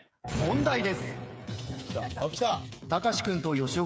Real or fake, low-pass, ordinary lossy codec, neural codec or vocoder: fake; none; none; codec, 16 kHz, 8 kbps, FreqCodec, smaller model